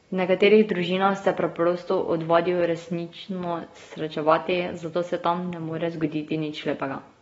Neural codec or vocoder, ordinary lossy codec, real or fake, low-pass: none; AAC, 24 kbps; real; 19.8 kHz